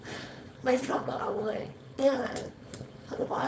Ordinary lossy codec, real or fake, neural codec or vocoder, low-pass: none; fake; codec, 16 kHz, 4.8 kbps, FACodec; none